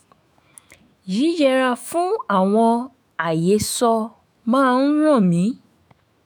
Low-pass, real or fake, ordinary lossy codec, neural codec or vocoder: none; fake; none; autoencoder, 48 kHz, 128 numbers a frame, DAC-VAE, trained on Japanese speech